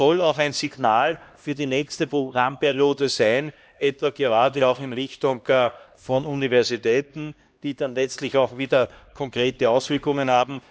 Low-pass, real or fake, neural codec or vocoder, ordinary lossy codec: none; fake; codec, 16 kHz, 1 kbps, X-Codec, HuBERT features, trained on LibriSpeech; none